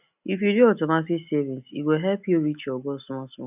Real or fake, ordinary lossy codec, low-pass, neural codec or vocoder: real; none; 3.6 kHz; none